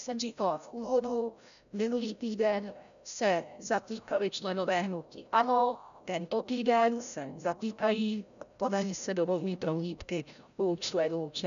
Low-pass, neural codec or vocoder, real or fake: 7.2 kHz; codec, 16 kHz, 0.5 kbps, FreqCodec, larger model; fake